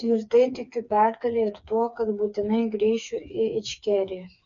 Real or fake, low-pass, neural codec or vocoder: fake; 7.2 kHz; codec, 16 kHz, 4 kbps, FreqCodec, smaller model